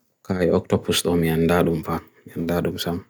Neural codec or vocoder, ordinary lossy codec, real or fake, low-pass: none; none; real; none